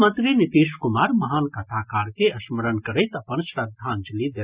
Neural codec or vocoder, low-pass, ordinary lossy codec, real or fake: none; 3.6 kHz; Opus, 64 kbps; real